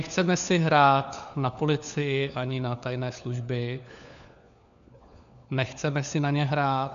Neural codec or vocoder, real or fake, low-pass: codec, 16 kHz, 4 kbps, FunCodec, trained on LibriTTS, 50 frames a second; fake; 7.2 kHz